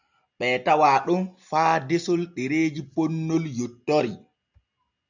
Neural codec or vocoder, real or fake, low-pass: none; real; 7.2 kHz